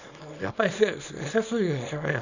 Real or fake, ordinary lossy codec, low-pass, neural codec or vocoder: fake; none; 7.2 kHz; codec, 24 kHz, 0.9 kbps, WavTokenizer, small release